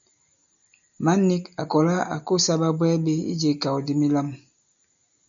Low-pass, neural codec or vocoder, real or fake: 7.2 kHz; none; real